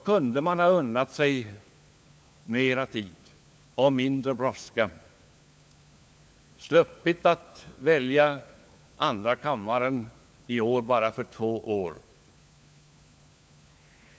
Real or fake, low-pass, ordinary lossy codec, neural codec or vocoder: fake; none; none; codec, 16 kHz, 4 kbps, FunCodec, trained on LibriTTS, 50 frames a second